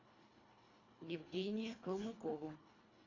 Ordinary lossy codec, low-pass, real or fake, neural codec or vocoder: AAC, 32 kbps; 7.2 kHz; fake; codec, 24 kHz, 1.5 kbps, HILCodec